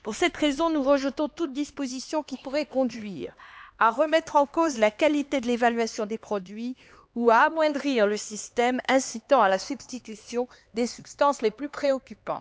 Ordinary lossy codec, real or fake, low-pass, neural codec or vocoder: none; fake; none; codec, 16 kHz, 2 kbps, X-Codec, HuBERT features, trained on LibriSpeech